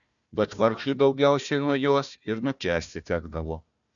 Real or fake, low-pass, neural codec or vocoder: fake; 7.2 kHz; codec, 16 kHz, 1 kbps, FunCodec, trained on Chinese and English, 50 frames a second